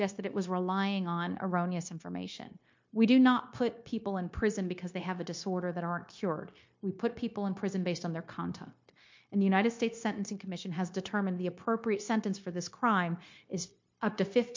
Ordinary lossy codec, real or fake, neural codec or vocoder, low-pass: MP3, 48 kbps; fake; codec, 16 kHz, 0.9 kbps, LongCat-Audio-Codec; 7.2 kHz